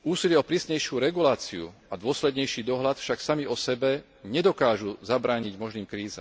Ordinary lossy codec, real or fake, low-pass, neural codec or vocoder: none; real; none; none